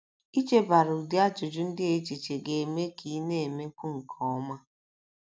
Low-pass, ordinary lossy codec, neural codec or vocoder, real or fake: none; none; none; real